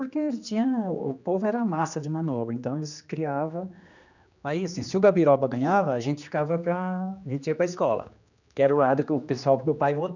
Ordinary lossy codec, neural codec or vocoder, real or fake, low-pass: none; codec, 16 kHz, 2 kbps, X-Codec, HuBERT features, trained on general audio; fake; 7.2 kHz